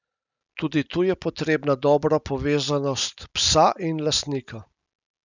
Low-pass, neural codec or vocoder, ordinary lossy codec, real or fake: 7.2 kHz; none; none; real